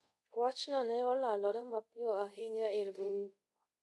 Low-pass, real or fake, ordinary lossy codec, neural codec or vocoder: none; fake; none; codec, 24 kHz, 0.5 kbps, DualCodec